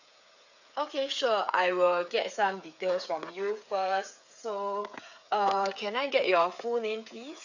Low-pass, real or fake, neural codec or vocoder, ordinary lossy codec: 7.2 kHz; fake; codec, 16 kHz, 8 kbps, FreqCodec, smaller model; none